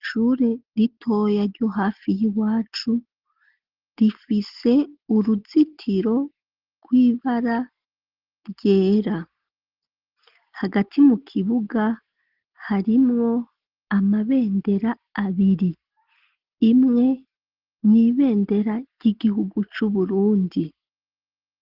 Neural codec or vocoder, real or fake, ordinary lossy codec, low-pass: none; real; Opus, 16 kbps; 5.4 kHz